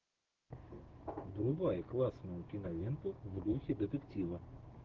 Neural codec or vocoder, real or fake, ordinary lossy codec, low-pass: codec, 16 kHz, 6 kbps, DAC; fake; Opus, 16 kbps; 7.2 kHz